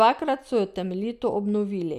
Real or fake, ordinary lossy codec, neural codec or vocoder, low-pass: real; none; none; 14.4 kHz